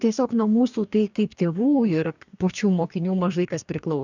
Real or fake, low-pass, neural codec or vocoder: fake; 7.2 kHz; codec, 44.1 kHz, 2.6 kbps, DAC